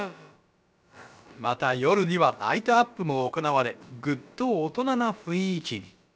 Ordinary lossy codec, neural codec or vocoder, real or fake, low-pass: none; codec, 16 kHz, about 1 kbps, DyCAST, with the encoder's durations; fake; none